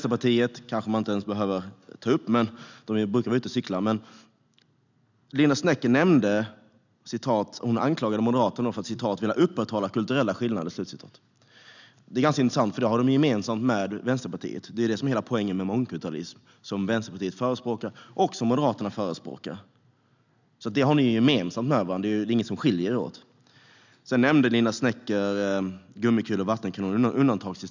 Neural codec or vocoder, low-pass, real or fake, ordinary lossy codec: none; 7.2 kHz; real; none